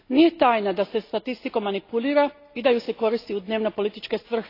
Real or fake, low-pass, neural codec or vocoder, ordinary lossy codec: real; 5.4 kHz; none; AAC, 32 kbps